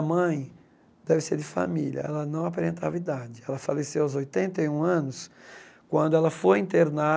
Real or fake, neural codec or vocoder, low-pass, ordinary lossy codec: real; none; none; none